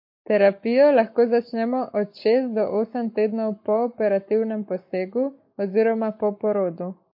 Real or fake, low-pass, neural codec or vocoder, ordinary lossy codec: fake; 5.4 kHz; codec, 44.1 kHz, 7.8 kbps, Pupu-Codec; MP3, 32 kbps